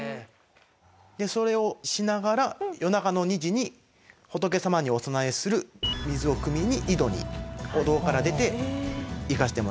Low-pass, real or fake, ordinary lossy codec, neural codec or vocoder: none; real; none; none